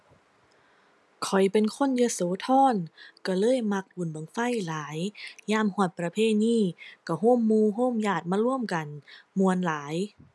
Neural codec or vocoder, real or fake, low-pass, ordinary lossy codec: none; real; none; none